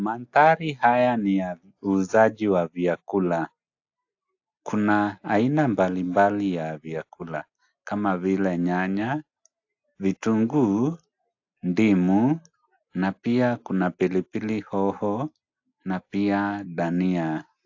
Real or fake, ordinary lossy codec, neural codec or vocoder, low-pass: real; AAC, 48 kbps; none; 7.2 kHz